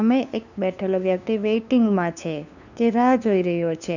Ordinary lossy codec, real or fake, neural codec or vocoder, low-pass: none; fake; codec, 16 kHz, 2 kbps, FunCodec, trained on LibriTTS, 25 frames a second; 7.2 kHz